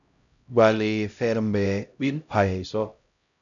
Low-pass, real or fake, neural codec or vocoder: 7.2 kHz; fake; codec, 16 kHz, 0.5 kbps, X-Codec, HuBERT features, trained on LibriSpeech